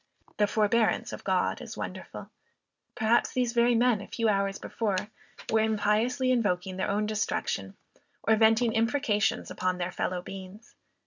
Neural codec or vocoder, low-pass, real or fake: none; 7.2 kHz; real